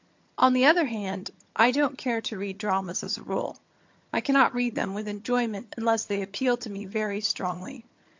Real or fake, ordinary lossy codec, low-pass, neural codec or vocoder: fake; MP3, 48 kbps; 7.2 kHz; vocoder, 22.05 kHz, 80 mel bands, HiFi-GAN